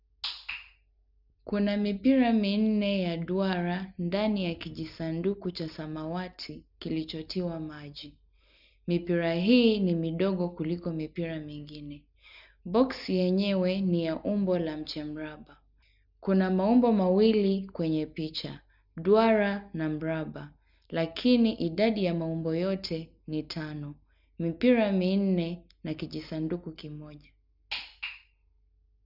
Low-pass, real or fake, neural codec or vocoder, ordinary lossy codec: 5.4 kHz; real; none; none